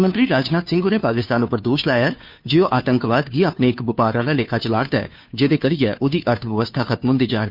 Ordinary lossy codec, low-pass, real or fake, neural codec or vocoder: none; 5.4 kHz; fake; codec, 16 kHz, 2 kbps, FunCodec, trained on Chinese and English, 25 frames a second